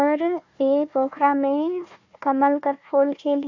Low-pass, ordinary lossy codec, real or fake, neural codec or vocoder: 7.2 kHz; AAC, 48 kbps; fake; codec, 16 kHz, 1 kbps, FunCodec, trained on Chinese and English, 50 frames a second